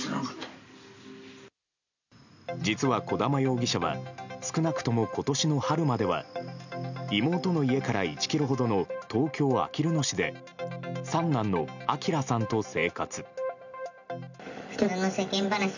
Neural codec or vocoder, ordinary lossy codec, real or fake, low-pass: none; none; real; 7.2 kHz